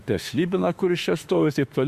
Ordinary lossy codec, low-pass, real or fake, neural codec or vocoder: Opus, 64 kbps; 14.4 kHz; fake; autoencoder, 48 kHz, 32 numbers a frame, DAC-VAE, trained on Japanese speech